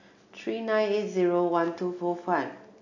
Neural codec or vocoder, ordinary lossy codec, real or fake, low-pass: none; AAC, 48 kbps; real; 7.2 kHz